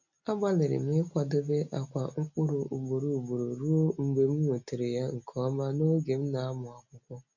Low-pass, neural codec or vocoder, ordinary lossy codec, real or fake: 7.2 kHz; none; none; real